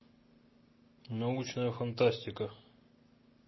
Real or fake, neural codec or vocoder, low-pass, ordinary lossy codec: real; none; 7.2 kHz; MP3, 24 kbps